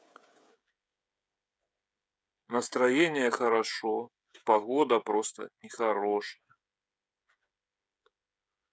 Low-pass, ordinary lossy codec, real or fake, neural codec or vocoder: none; none; fake; codec, 16 kHz, 16 kbps, FreqCodec, smaller model